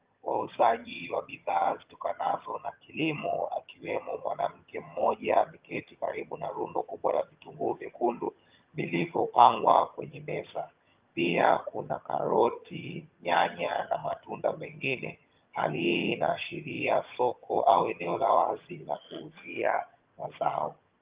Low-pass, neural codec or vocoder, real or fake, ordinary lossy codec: 3.6 kHz; vocoder, 22.05 kHz, 80 mel bands, HiFi-GAN; fake; Opus, 32 kbps